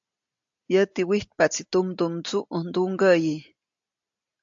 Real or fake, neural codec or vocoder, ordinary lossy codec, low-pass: real; none; AAC, 64 kbps; 7.2 kHz